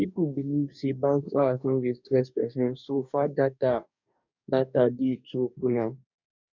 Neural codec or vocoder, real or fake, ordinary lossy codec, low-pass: codec, 44.1 kHz, 2.6 kbps, DAC; fake; none; 7.2 kHz